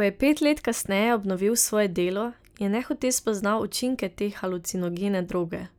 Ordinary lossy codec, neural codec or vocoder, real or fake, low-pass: none; none; real; none